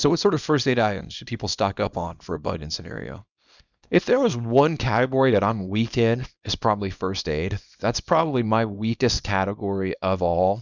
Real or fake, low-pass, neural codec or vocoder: fake; 7.2 kHz; codec, 24 kHz, 0.9 kbps, WavTokenizer, small release